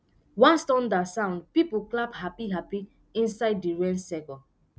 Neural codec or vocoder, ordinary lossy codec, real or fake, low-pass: none; none; real; none